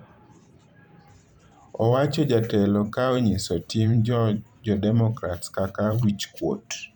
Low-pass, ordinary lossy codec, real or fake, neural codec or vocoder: 19.8 kHz; none; fake; vocoder, 44.1 kHz, 128 mel bands every 512 samples, BigVGAN v2